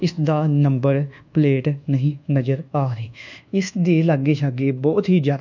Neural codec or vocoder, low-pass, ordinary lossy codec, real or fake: codec, 24 kHz, 1.2 kbps, DualCodec; 7.2 kHz; none; fake